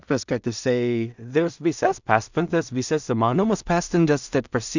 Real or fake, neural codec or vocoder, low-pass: fake; codec, 16 kHz in and 24 kHz out, 0.4 kbps, LongCat-Audio-Codec, two codebook decoder; 7.2 kHz